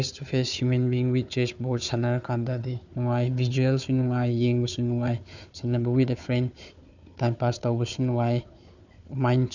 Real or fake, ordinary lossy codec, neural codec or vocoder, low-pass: fake; none; codec, 16 kHz, 4 kbps, FunCodec, trained on Chinese and English, 50 frames a second; 7.2 kHz